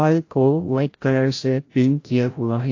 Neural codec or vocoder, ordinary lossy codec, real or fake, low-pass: codec, 16 kHz, 0.5 kbps, FreqCodec, larger model; AAC, 48 kbps; fake; 7.2 kHz